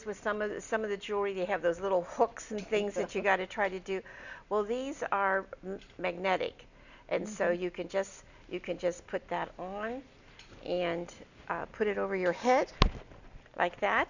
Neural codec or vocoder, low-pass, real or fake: none; 7.2 kHz; real